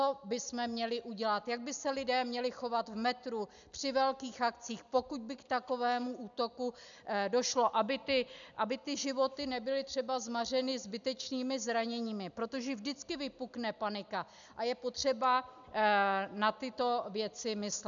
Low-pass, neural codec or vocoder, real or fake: 7.2 kHz; none; real